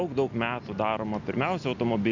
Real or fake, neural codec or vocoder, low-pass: real; none; 7.2 kHz